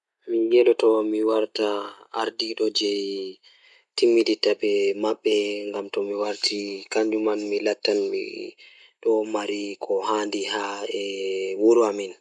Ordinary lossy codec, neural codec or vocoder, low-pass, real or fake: none; none; 10.8 kHz; real